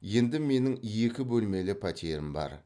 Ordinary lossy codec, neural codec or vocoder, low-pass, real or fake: none; none; 9.9 kHz; real